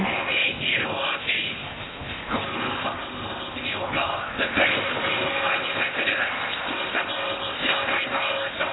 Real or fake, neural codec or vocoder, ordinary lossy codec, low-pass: fake; codec, 16 kHz in and 24 kHz out, 0.8 kbps, FocalCodec, streaming, 65536 codes; AAC, 16 kbps; 7.2 kHz